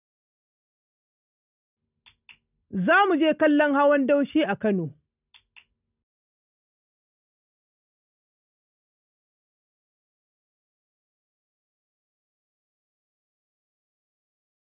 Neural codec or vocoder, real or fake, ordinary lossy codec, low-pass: none; real; none; 3.6 kHz